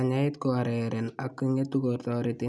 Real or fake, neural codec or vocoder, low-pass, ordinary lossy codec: real; none; none; none